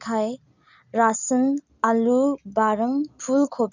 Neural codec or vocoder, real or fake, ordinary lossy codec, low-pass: none; real; none; 7.2 kHz